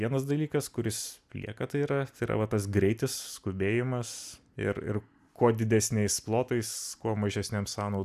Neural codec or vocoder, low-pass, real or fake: none; 14.4 kHz; real